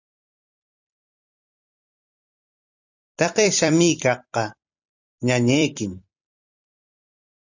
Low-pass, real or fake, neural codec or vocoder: 7.2 kHz; fake; vocoder, 44.1 kHz, 128 mel bands every 256 samples, BigVGAN v2